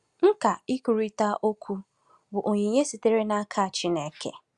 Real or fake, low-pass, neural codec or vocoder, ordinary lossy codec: real; 10.8 kHz; none; Opus, 64 kbps